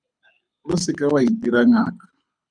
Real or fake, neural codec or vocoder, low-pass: fake; codec, 24 kHz, 6 kbps, HILCodec; 9.9 kHz